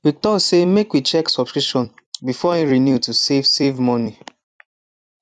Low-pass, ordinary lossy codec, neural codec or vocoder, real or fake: 10.8 kHz; none; vocoder, 44.1 kHz, 128 mel bands every 256 samples, BigVGAN v2; fake